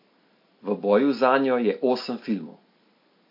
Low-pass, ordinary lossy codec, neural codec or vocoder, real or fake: 5.4 kHz; MP3, 32 kbps; none; real